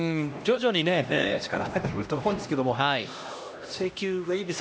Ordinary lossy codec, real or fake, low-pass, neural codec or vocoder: none; fake; none; codec, 16 kHz, 1 kbps, X-Codec, HuBERT features, trained on LibriSpeech